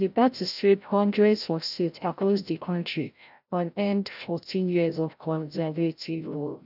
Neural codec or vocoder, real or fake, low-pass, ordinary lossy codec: codec, 16 kHz, 0.5 kbps, FreqCodec, larger model; fake; 5.4 kHz; none